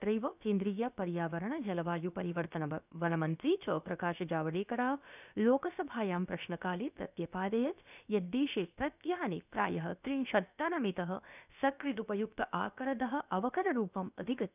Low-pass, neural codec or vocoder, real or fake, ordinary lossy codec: 3.6 kHz; codec, 16 kHz, about 1 kbps, DyCAST, with the encoder's durations; fake; none